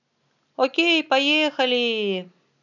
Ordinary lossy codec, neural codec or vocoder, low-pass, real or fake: none; none; 7.2 kHz; real